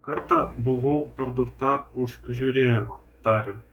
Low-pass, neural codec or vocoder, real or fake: 19.8 kHz; codec, 44.1 kHz, 2.6 kbps, DAC; fake